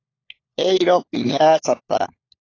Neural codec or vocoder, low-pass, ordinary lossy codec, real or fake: codec, 16 kHz, 4 kbps, FunCodec, trained on LibriTTS, 50 frames a second; 7.2 kHz; AAC, 32 kbps; fake